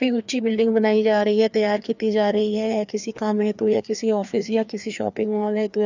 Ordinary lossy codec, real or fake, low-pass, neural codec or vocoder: none; fake; 7.2 kHz; codec, 16 kHz, 2 kbps, FreqCodec, larger model